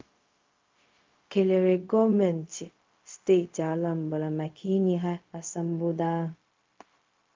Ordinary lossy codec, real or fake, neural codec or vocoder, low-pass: Opus, 32 kbps; fake; codec, 16 kHz, 0.4 kbps, LongCat-Audio-Codec; 7.2 kHz